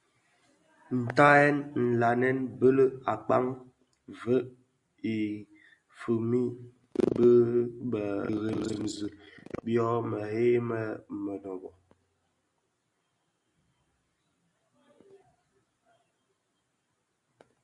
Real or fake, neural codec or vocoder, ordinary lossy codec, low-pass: real; none; Opus, 64 kbps; 10.8 kHz